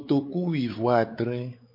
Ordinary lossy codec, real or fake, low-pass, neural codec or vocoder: MP3, 32 kbps; fake; 5.4 kHz; codec, 16 kHz, 4 kbps, X-Codec, WavLM features, trained on Multilingual LibriSpeech